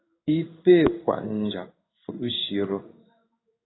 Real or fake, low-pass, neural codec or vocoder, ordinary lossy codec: fake; 7.2 kHz; codec, 16 kHz in and 24 kHz out, 1 kbps, XY-Tokenizer; AAC, 16 kbps